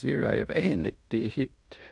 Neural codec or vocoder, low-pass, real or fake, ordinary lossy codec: codec, 16 kHz in and 24 kHz out, 0.9 kbps, LongCat-Audio-Codec, fine tuned four codebook decoder; 10.8 kHz; fake; none